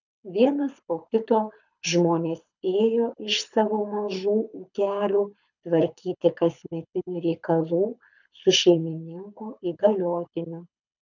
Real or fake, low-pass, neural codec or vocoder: fake; 7.2 kHz; codec, 24 kHz, 6 kbps, HILCodec